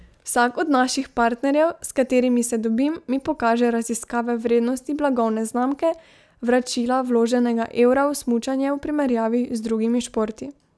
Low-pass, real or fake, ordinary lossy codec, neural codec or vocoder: none; real; none; none